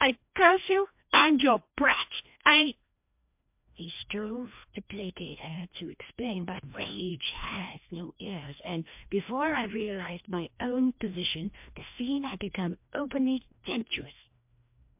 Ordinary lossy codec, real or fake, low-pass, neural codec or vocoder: MP3, 32 kbps; fake; 3.6 kHz; codec, 16 kHz, 1 kbps, FreqCodec, larger model